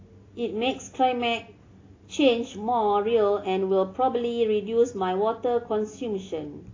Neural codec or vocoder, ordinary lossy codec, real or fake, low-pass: none; AAC, 32 kbps; real; 7.2 kHz